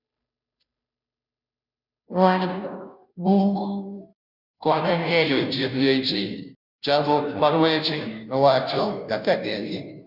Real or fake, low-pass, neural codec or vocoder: fake; 5.4 kHz; codec, 16 kHz, 0.5 kbps, FunCodec, trained on Chinese and English, 25 frames a second